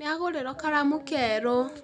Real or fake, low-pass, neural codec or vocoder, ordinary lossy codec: real; 9.9 kHz; none; none